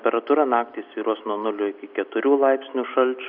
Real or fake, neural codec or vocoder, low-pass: real; none; 5.4 kHz